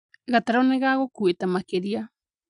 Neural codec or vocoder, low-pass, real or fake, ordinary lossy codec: none; 9.9 kHz; real; none